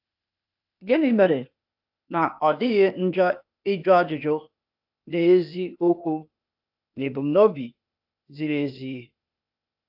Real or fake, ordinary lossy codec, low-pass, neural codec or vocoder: fake; AAC, 48 kbps; 5.4 kHz; codec, 16 kHz, 0.8 kbps, ZipCodec